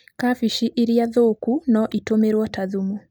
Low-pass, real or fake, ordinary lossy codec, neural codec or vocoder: none; real; none; none